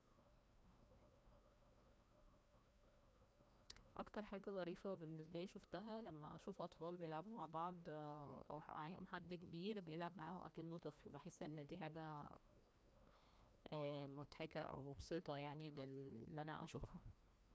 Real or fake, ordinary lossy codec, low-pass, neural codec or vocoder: fake; none; none; codec, 16 kHz, 1 kbps, FreqCodec, larger model